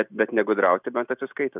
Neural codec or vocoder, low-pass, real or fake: none; 3.6 kHz; real